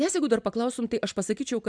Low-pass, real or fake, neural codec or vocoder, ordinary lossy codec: 9.9 kHz; real; none; MP3, 96 kbps